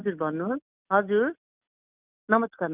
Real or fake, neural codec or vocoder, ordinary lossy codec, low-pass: real; none; none; 3.6 kHz